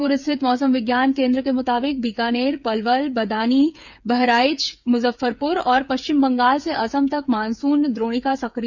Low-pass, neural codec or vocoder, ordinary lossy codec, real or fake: 7.2 kHz; codec, 16 kHz, 8 kbps, FreqCodec, smaller model; none; fake